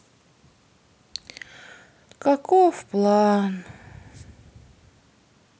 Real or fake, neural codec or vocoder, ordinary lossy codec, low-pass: real; none; none; none